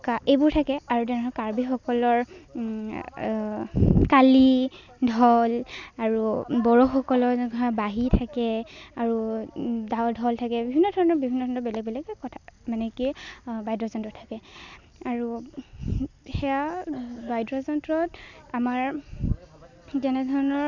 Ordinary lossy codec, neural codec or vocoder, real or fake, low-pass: none; none; real; 7.2 kHz